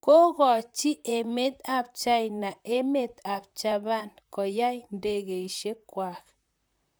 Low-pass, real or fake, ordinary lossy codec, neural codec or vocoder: none; fake; none; vocoder, 44.1 kHz, 128 mel bands, Pupu-Vocoder